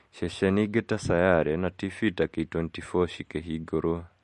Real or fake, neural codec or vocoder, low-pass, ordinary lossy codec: real; none; 14.4 kHz; MP3, 48 kbps